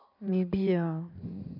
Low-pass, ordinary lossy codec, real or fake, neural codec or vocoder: 5.4 kHz; none; fake; codec, 16 kHz in and 24 kHz out, 2.2 kbps, FireRedTTS-2 codec